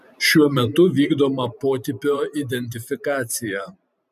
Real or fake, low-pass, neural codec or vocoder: fake; 14.4 kHz; vocoder, 44.1 kHz, 128 mel bands every 512 samples, BigVGAN v2